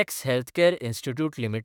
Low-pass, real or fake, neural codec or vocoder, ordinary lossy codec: 19.8 kHz; fake; autoencoder, 48 kHz, 32 numbers a frame, DAC-VAE, trained on Japanese speech; none